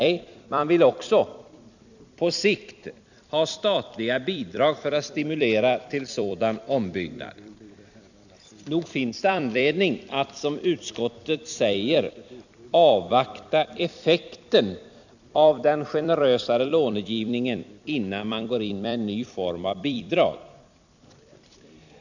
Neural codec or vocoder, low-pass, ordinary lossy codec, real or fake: vocoder, 44.1 kHz, 128 mel bands every 256 samples, BigVGAN v2; 7.2 kHz; none; fake